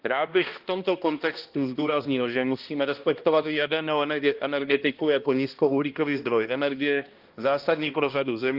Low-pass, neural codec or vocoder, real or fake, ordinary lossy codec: 5.4 kHz; codec, 16 kHz, 1 kbps, X-Codec, HuBERT features, trained on balanced general audio; fake; Opus, 32 kbps